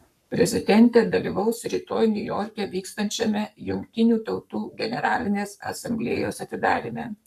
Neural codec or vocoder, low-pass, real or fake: codec, 44.1 kHz, 7.8 kbps, Pupu-Codec; 14.4 kHz; fake